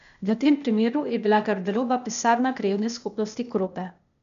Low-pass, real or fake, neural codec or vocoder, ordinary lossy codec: 7.2 kHz; fake; codec, 16 kHz, 0.8 kbps, ZipCodec; none